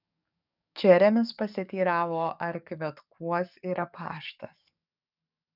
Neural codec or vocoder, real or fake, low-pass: codec, 44.1 kHz, 7.8 kbps, DAC; fake; 5.4 kHz